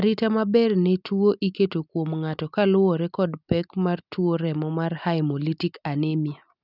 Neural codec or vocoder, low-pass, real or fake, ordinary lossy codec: none; 5.4 kHz; real; none